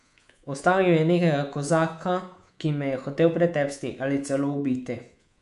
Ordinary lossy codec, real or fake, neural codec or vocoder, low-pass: MP3, 96 kbps; fake; codec, 24 kHz, 3.1 kbps, DualCodec; 10.8 kHz